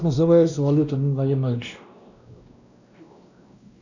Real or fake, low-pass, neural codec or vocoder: fake; 7.2 kHz; codec, 16 kHz, 1 kbps, X-Codec, WavLM features, trained on Multilingual LibriSpeech